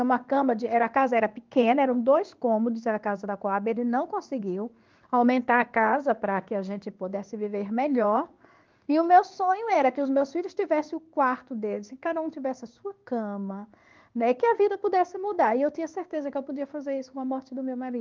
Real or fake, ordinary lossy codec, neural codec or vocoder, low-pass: fake; Opus, 24 kbps; codec, 16 kHz in and 24 kHz out, 1 kbps, XY-Tokenizer; 7.2 kHz